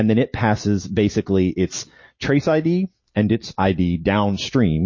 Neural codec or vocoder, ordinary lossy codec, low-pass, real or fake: none; MP3, 32 kbps; 7.2 kHz; real